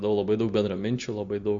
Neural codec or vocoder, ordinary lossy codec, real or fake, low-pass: none; Opus, 64 kbps; real; 7.2 kHz